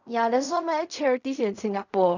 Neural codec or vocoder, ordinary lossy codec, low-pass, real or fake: codec, 16 kHz in and 24 kHz out, 0.4 kbps, LongCat-Audio-Codec, fine tuned four codebook decoder; none; 7.2 kHz; fake